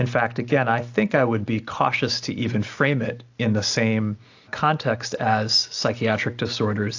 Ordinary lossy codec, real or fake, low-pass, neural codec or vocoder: AAC, 48 kbps; real; 7.2 kHz; none